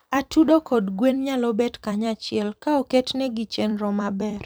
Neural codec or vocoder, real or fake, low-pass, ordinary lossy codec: vocoder, 44.1 kHz, 128 mel bands every 256 samples, BigVGAN v2; fake; none; none